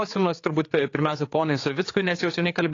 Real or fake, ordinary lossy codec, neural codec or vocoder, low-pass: fake; AAC, 32 kbps; codec, 16 kHz, 4 kbps, X-Codec, HuBERT features, trained on general audio; 7.2 kHz